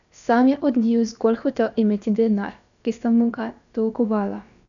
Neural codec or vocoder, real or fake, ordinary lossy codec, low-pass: codec, 16 kHz, about 1 kbps, DyCAST, with the encoder's durations; fake; none; 7.2 kHz